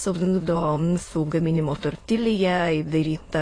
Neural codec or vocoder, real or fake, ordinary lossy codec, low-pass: autoencoder, 22.05 kHz, a latent of 192 numbers a frame, VITS, trained on many speakers; fake; AAC, 32 kbps; 9.9 kHz